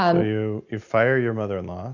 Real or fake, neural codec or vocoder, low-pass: real; none; 7.2 kHz